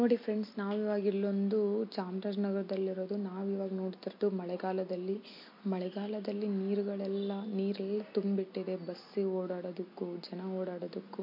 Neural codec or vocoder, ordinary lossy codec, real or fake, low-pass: none; MP3, 32 kbps; real; 5.4 kHz